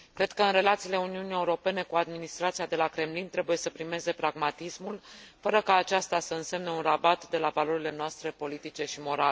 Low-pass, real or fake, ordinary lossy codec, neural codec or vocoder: none; real; none; none